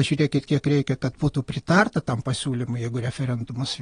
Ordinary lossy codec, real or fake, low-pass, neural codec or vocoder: AAC, 32 kbps; real; 9.9 kHz; none